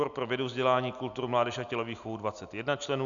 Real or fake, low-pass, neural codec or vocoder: real; 7.2 kHz; none